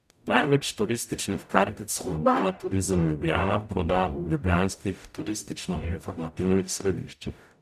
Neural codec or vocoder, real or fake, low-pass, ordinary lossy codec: codec, 44.1 kHz, 0.9 kbps, DAC; fake; 14.4 kHz; none